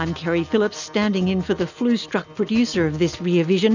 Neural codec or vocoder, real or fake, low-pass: none; real; 7.2 kHz